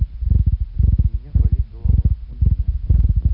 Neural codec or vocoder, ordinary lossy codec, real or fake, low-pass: none; none; real; 5.4 kHz